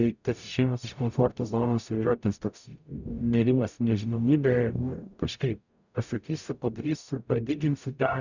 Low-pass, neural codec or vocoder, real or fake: 7.2 kHz; codec, 44.1 kHz, 0.9 kbps, DAC; fake